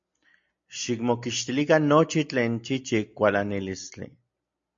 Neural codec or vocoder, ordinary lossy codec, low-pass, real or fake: none; MP3, 48 kbps; 7.2 kHz; real